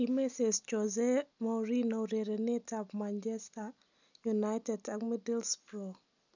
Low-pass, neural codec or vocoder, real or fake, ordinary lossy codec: 7.2 kHz; none; real; none